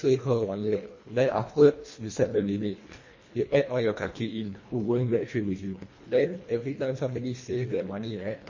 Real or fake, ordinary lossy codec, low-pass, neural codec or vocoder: fake; MP3, 32 kbps; 7.2 kHz; codec, 24 kHz, 1.5 kbps, HILCodec